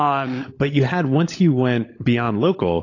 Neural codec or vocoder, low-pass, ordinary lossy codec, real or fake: codec, 16 kHz, 16 kbps, FunCodec, trained on LibriTTS, 50 frames a second; 7.2 kHz; AAC, 32 kbps; fake